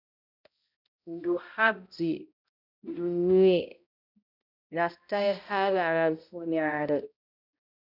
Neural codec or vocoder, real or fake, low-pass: codec, 16 kHz, 0.5 kbps, X-Codec, HuBERT features, trained on balanced general audio; fake; 5.4 kHz